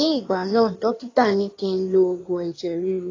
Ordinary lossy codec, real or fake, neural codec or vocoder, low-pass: AAC, 32 kbps; fake; codec, 16 kHz in and 24 kHz out, 1.1 kbps, FireRedTTS-2 codec; 7.2 kHz